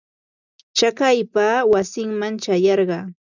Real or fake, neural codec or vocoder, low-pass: real; none; 7.2 kHz